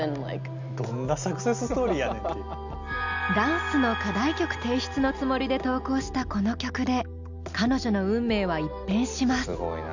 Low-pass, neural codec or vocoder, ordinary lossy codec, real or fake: 7.2 kHz; none; none; real